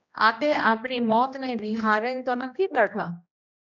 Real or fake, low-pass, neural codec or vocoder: fake; 7.2 kHz; codec, 16 kHz, 1 kbps, X-Codec, HuBERT features, trained on general audio